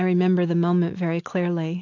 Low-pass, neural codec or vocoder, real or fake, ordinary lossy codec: 7.2 kHz; none; real; AAC, 48 kbps